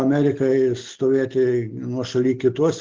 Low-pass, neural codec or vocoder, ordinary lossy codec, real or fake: 7.2 kHz; none; Opus, 16 kbps; real